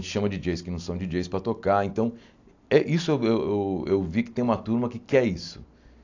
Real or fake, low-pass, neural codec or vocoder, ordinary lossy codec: real; 7.2 kHz; none; AAC, 48 kbps